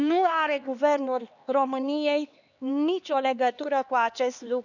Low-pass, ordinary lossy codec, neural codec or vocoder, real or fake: 7.2 kHz; none; codec, 16 kHz, 2 kbps, X-Codec, HuBERT features, trained on LibriSpeech; fake